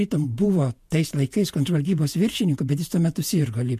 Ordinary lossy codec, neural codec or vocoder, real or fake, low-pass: MP3, 64 kbps; vocoder, 48 kHz, 128 mel bands, Vocos; fake; 14.4 kHz